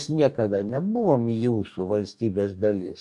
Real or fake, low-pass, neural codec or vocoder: fake; 10.8 kHz; codec, 44.1 kHz, 2.6 kbps, DAC